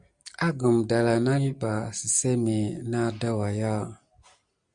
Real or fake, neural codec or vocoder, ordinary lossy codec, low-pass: real; none; Opus, 64 kbps; 9.9 kHz